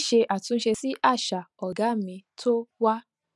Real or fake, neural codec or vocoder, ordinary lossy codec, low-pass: real; none; none; none